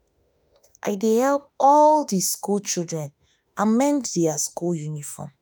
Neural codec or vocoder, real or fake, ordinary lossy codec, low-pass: autoencoder, 48 kHz, 32 numbers a frame, DAC-VAE, trained on Japanese speech; fake; none; none